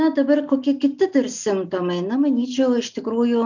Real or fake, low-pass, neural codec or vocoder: real; 7.2 kHz; none